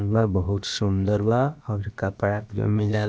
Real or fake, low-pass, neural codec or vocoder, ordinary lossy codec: fake; none; codec, 16 kHz, about 1 kbps, DyCAST, with the encoder's durations; none